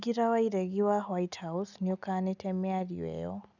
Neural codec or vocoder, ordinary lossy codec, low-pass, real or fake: none; none; 7.2 kHz; real